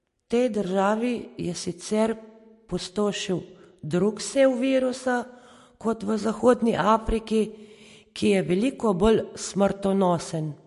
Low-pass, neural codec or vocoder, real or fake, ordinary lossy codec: 14.4 kHz; none; real; MP3, 48 kbps